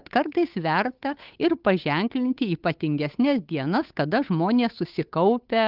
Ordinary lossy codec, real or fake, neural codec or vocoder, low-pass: Opus, 24 kbps; fake; codec, 16 kHz, 8 kbps, FunCodec, trained on Chinese and English, 25 frames a second; 5.4 kHz